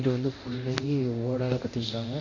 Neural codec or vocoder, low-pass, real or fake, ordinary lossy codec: codec, 24 kHz, 0.9 kbps, DualCodec; 7.2 kHz; fake; none